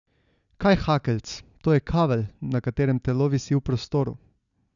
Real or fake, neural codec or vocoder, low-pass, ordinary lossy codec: real; none; 7.2 kHz; none